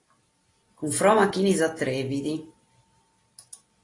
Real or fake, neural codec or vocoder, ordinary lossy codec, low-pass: real; none; AAC, 32 kbps; 10.8 kHz